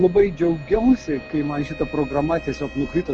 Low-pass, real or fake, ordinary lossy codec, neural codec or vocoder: 7.2 kHz; real; Opus, 16 kbps; none